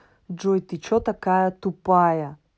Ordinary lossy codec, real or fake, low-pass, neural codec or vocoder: none; real; none; none